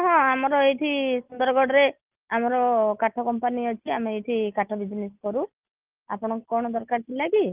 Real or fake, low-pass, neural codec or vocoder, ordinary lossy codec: real; 3.6 kHz; none; Opus, 24 kbps